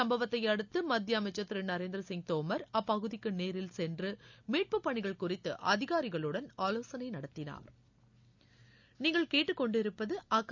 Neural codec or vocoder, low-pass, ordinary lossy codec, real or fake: none; 7.2 kHz; none; real